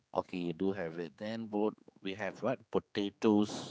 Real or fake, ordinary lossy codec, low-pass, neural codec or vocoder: fake; none; none; codec, 16 kHz, 4 kbps, X-Codec, HuBERT features, trained on general audio